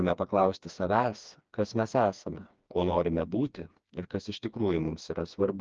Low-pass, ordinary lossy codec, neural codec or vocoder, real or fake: 7.2 kHz; Opus, 24 kbps; codec, 16 kHz, 2 kbps, FreqCodec, smaller model; fake